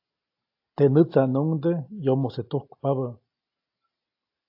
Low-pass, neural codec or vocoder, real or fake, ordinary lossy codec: 5.4 kHz; none; real; AAC, 48 kbps